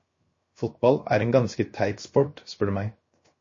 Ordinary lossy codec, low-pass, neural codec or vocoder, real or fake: MP3, 32 kbps; 7.2 kHz; codec, 16 kHz, 0.7 kbps, FocalCodec; fake